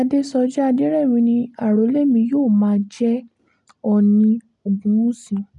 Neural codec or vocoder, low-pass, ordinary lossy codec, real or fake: none; 10.8 kHz; none; real